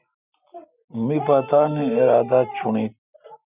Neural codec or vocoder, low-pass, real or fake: none; 3.6 kHz; real